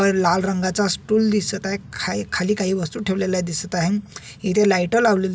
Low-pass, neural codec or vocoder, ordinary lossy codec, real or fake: none; none; none; real